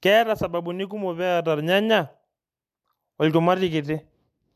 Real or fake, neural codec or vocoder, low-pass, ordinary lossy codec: real; none; 14.4 kHz; MP3, 96 kbps